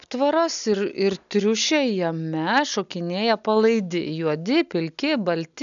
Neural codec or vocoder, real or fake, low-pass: none; real; 7.2 kHz